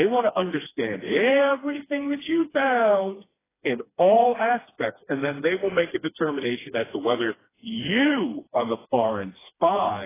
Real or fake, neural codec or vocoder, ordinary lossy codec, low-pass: fake; codec, 16 kHz, 2 kbps, FreqCodec, smaller model; AAC, 16 kbps; 3.6 kHz